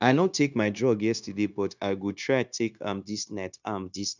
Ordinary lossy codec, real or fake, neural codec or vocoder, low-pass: none; fake; codec, 16 kHz, 0.9 kbps, LongCat-Audio-Codec; 7.2 kHz